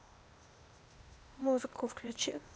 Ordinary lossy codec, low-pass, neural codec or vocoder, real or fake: none; none; codec, 16 kHz, 0.8 kbps, ZipCodec; fake